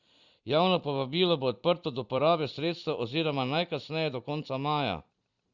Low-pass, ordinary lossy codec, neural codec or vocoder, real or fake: 7.2 kHz; Opus, 64 kbps; vocoder, 44.1 kHz, 128 mel bands every 256 samples, BigVGAN v2; fake